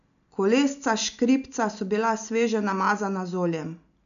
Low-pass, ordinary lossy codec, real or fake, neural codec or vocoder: 7.2 kHz; none; real; none